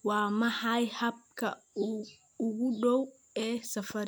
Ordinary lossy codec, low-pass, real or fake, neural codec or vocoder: none; none; real; none